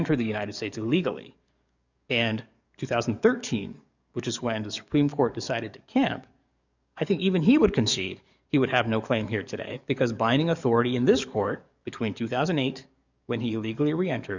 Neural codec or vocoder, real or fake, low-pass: codec, 44.1 kHz, 7.8 kbps, DAC; fake; 7.2 kHz